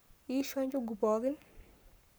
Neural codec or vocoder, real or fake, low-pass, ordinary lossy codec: codec, 44.1 kHz, 7.8 kbps, Pupu-Codec; fake; none; none